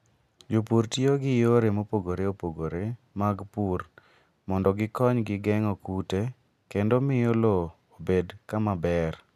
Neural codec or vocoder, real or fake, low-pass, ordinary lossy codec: none; real; 14.4 kHz; none